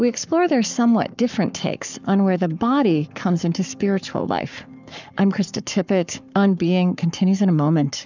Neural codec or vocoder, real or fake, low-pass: codec, 44.1 kHz, 7.8 kbps, Pupu-Codec; fake; 7.2 kHz